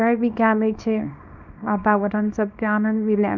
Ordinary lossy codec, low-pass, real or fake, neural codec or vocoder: Opus, 64 kbps; 7.2 kHz; fake; codec, 24 kHz, 0.9 kbps, WavTokenizer, small release